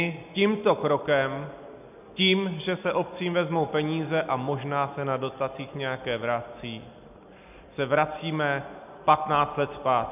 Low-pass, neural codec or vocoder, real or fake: 3.6 kHz; none; real